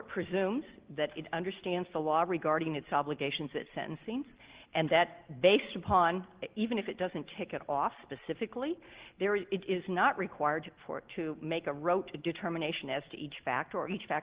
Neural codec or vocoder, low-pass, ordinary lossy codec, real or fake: none; 3.6 kHz; Opus, 16 kbps; real